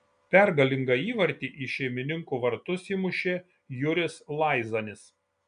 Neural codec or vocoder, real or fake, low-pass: none; real; 9.9 kHz